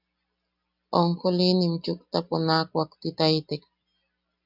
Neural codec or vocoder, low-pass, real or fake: none; 5.4 kHz; real